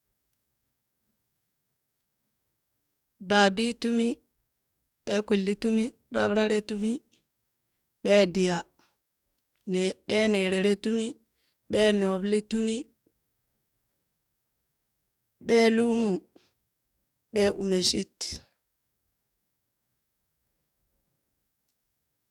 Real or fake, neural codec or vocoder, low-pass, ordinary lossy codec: fake; codec, 44.1 kHz, 2.6 kbps, DAC; 19.8 kHz; none